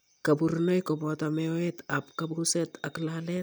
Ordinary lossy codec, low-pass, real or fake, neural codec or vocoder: none; none; real; none